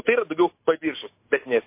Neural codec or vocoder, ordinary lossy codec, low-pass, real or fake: none; MP3, 24 kbps; 3.6 kHz; real